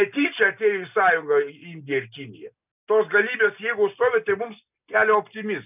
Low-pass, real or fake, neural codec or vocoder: 3.6 kHz; real; none